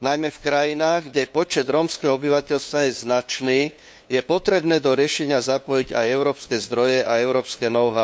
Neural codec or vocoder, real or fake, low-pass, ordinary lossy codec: codec, 16 kHz, 2 kbps, FunCodec, trained on LibriTTS, 25 frames a second; fake; none; none